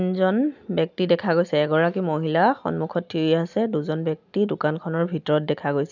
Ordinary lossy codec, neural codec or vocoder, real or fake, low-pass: none; none; real; 7.2 kHz